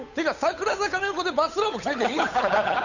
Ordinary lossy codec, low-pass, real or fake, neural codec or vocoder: none; 7.2 kHz; fake; codec, 16 kHz, 8 kbps, FunCodec, trained on Chinese and English, 25 frames a second